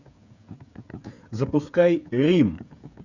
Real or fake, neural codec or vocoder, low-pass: fake; codec, 16 kHz, 8 kbps, FreqCodec, smaller model; 7.2 kHz